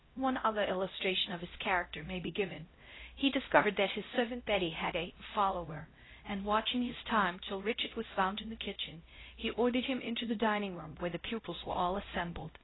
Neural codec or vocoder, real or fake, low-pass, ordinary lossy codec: codec, 16 kHz, 0.5 kbps, X-Codec, HuBERT features, trained on LibriSpeech; fake; 7.2 kHz; AAC, 16 kbps